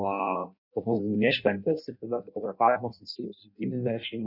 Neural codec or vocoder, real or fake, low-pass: codec, 16 kHz in and 24 kHz out, 0.6 kbps, FireRedTTS-2 codec; fake; 5.4 kHz